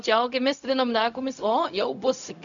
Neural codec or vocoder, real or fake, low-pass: codec, 16 kHz, 0.4 kbps, LongCat-Audio-Codec; fake; 7.2 kHz